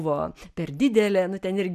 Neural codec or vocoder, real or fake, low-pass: none; real; 14.4 kHz